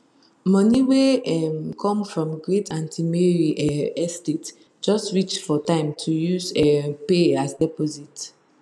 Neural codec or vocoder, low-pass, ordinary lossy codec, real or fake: none; none; none; real